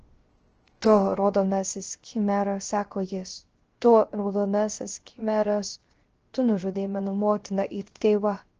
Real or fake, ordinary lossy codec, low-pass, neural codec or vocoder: fake; Opus, 16 kbps; 7.2 kHz; codec, 16 kHz, 0.3 kbps, FocalCodec